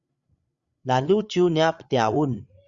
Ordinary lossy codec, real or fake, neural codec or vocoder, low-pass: Opus, 64 kbps; fake; codec, 16 kHz, 8 kbps, FreqCodec, larger model; 7.2 kHz